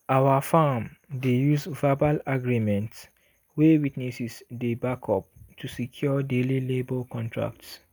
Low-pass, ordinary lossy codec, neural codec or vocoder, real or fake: none; none; none; real